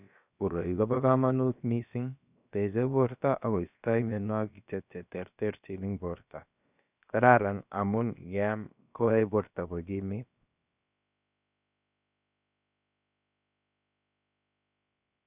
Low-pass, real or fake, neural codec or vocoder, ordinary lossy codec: 3.6 kHz; fake; codec, 16 kHz, about 1 kbps, DyCAST, with the encoder's durations; none